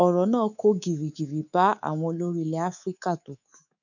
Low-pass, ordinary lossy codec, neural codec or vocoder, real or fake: 7.2 kHz; none; codec, 24 kHz, 3.1 kbps, DualCodec; fake